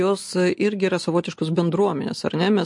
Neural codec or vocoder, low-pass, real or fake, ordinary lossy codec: none; 10.8 kHz; real; MP3, 48 kbps